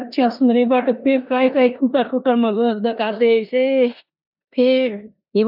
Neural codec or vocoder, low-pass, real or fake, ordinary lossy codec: codec, 16 kHz in and 24 kHz out, 0.9 kbps, LongCat-Audio-Codec, four codebook decoder; 5.4 kHz; fake; none